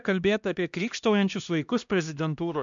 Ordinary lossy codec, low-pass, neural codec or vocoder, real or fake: MP3, 64 kbps; 7.2 kHz; codec, 16 kHz, 1 kbps, X-Codec, HuBERT features, trained on LibriSpeech; fake